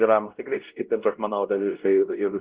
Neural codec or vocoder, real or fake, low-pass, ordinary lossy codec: codec, 16 kHz, 0.5 kbps, X-Codec, WavLM features, trained on Multilingual LibriSpeech; fake; 3.6 kHz; Opus, 16 kbps